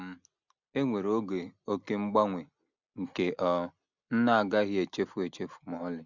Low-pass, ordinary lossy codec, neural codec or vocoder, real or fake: 7.2 kHz; none; none; real